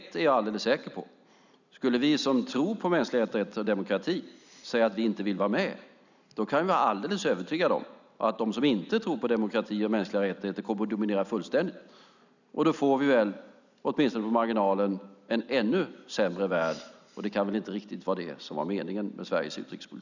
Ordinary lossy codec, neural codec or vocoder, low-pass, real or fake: none; none; 7.2 kHz; real